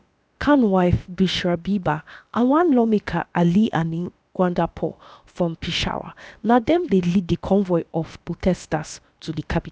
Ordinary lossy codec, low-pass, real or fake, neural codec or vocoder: none; none; fake; codec, 16 kHz, about 1 kbps, DyCAST, with the encoder's durations